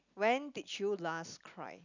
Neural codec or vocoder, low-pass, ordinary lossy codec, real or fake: none; 7.2 kHz; none; real